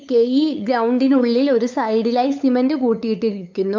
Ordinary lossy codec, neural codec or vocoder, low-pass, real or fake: MP3, 64 kbps; codec, 16 kHz, 8 kbps, FunCodec, trained on LibriTTS, 25 frames a second; 7.2 kHz; fake